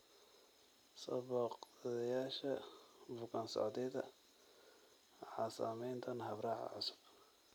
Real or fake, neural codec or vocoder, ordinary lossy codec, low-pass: real; none; none; none